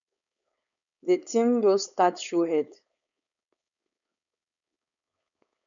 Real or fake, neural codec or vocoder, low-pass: fake; codec, 16 kHz, 4.8 kbps, FACodec; 7.2 kHz